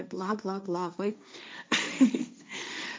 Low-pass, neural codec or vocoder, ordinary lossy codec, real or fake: none; codec, 16 kHz, 1.1 kbps, Voila-Tokenizer; none; fake